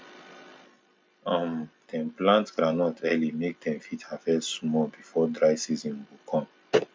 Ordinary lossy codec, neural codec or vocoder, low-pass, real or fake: none; none; none; real